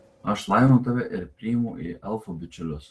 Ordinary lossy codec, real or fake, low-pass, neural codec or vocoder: Opus, 16 kbps; real; 10.8 kHz; none